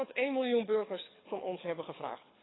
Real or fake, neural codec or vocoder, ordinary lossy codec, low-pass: fake; codec, 24 kHz, 6 kbps, HILCodec; AAC, 16 kbps; 7.2 kHz